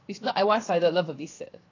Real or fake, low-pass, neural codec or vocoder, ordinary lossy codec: fake; 7.2 kHz; codec, 16 kHz, 0.7 kbps, FocalCodec; AAC, 32 kbps